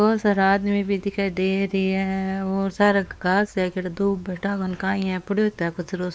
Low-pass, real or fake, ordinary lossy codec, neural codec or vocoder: none; fake; none; codec, 16 kHz, 4 kbps, X-Codec, WavLM features, trained on Multilingual LibriSpeech